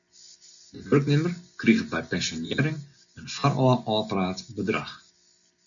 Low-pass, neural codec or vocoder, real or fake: 7.2 kHz; none; real